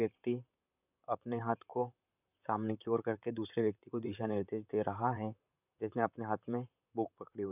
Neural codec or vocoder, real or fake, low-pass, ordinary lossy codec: vocoder, 44.1 kHz, 128 mel bands, Pupu-Vocoder; fake; 3.6 kHz; none